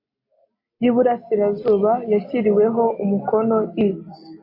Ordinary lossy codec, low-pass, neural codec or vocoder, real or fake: MP3, 24 kbps; 5.4 kHz; none; real